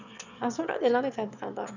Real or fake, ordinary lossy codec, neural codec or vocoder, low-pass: fake; none; autoencoder, 22.05 kHz, a latent of 192 numbers a frame, VITS, trained on one speaker; 7.2 kHz